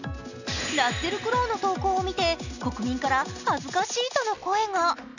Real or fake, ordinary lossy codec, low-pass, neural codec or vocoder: real; none; 7.2 kHz; none